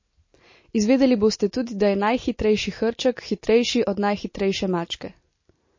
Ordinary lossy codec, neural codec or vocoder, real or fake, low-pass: MP3, 32 kbps; none; real; 7.2 kHz